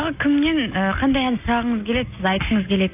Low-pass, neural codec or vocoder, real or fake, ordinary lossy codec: 3.6 kHz; none; real; AAC, 32 kbps